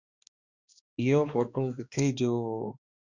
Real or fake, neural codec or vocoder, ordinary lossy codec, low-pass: fake; codec, 16 kHz, 2 kbps, X-Codec, HuBERT features, trained on balanced general audio; Opus, 64 kbps; 7.2 kHz